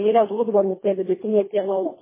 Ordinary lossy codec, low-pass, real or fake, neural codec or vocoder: MP3, 16 kbps; 3.6 kHz; fake; codec, 24 kHz, 1.5 kbps, HILCodec